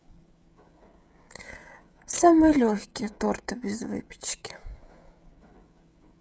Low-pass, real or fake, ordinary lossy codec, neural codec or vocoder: none; fake; none; codec, 16 kHz, 16 kbps, FreqCodec, smaller model